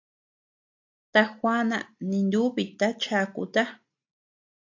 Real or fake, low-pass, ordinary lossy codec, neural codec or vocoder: real; 7.2 kHz; AAC, 48 kbps; none